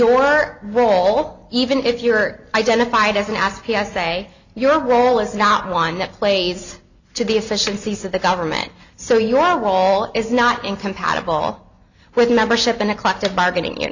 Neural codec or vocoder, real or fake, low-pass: none; real; 7.2 kHz